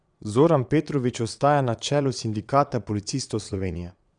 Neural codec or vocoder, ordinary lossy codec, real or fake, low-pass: vocoder, 22.05 kHz, 80 mel bands, Vocos; none; fake; 9.9 kHz